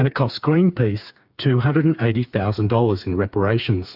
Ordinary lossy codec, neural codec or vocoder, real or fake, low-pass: AAC, 48 kbps; codec, 16 kHz, 4 kbps, FreqCodec, smaller model; fake; 5.4 kHz